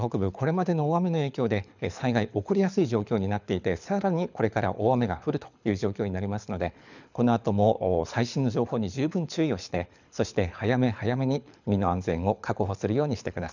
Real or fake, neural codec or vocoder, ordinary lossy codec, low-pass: fake; codec, 24 kHz, 6 kbps, HILCodec; none; 7.2 kHz